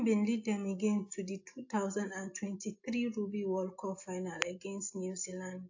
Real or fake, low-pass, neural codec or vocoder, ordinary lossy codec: real; 7.2 kHz; none; AAC, 48 kbps